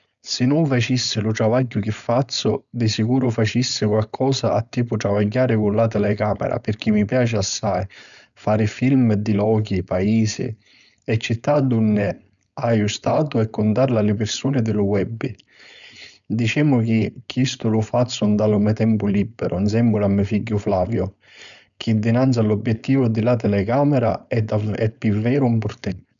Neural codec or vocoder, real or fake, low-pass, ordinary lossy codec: codec, 16 kHz, 4.8 kbps, FACodec; fake; 7.2 kHz; none